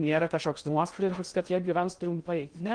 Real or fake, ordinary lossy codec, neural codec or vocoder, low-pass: fake; Opus, 32 kbps; codec, 16 kHz in and 24 kHz out, 0.6 kbps, FocalCodec, streaming, 2048 codes; 9.9 kHz